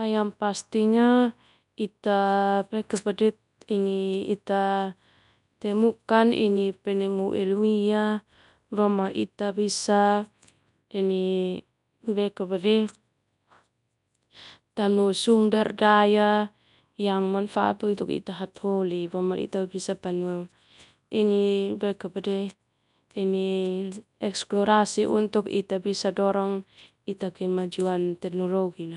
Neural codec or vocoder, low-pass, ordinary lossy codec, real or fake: codec, 24 kHz, 0.9 kbps, WavTokenizer, large speech release; 10.8 kHz; none; fake